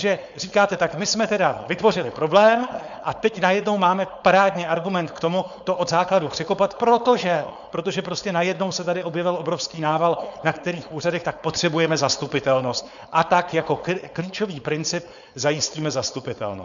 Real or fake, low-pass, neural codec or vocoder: fake; 7.2 kHz; codec, 16 kHz, 4.8 kbps, FACodec